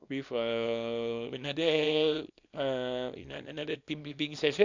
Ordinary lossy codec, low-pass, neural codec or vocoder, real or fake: none; 7.2 kHz; codec, 24 kHz, 0.9 kbps, WavTokenizer, small release; fake